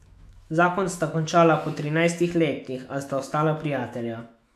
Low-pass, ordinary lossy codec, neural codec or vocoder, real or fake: 14.4 kHz; MP3, 96 kbps; autoencoder, 48 kHz, 128 numbers a frame, DAC-VAE, trained on Japanese speech; fake